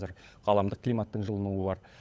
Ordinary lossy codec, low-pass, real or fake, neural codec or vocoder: none; none; fake; codec, 16 kHz, 16 kbps, FunCodec, trained on LibriTTS, 50 frames a second